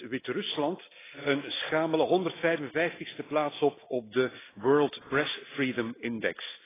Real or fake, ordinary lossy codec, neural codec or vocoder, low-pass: real; AAC, 16 kbps; none; 3.6 kHz